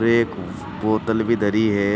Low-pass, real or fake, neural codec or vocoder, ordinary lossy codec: none; real; none; none